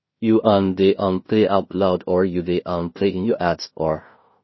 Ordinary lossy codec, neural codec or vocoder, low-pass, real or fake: MP3, 24 kbps; codec, 16 kHz in and 24 kHz out, 0.4 kbps, LongCat-Audio-Codec, two codebook decoder; 7.2 kHz; fake